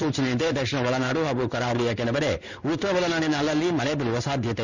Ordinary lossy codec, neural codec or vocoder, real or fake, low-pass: none; codec, 16 kHz in and 24 kHz out, 1 kbps, XY-Tokenizer; fake; 7.2 kHz